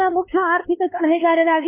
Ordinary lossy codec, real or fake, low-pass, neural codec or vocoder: none; fake; 3.6 kHz; codec, 16 kHz, 2 kbps, X-Codec, WavLM features, trained on Multilingual LibriSpeech